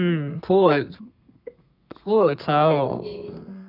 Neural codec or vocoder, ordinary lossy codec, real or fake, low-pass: codec, 32 kHz, 1.9 kbps, SNAC; none; fake; 5.4 kHz